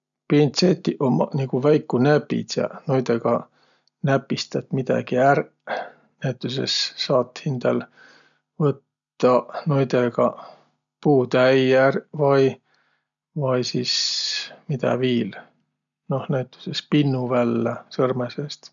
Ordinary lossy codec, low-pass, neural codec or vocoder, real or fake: none; 7.2 kHz; none; real